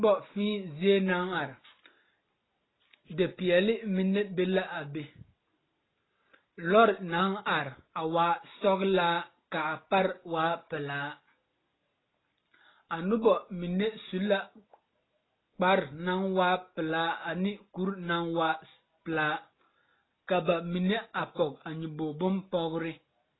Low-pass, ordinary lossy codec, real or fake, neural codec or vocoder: 7.2 kHz; AAC, 16 kbps; real; none